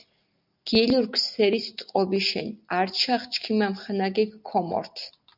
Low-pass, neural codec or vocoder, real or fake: 5.4 kHz; none; real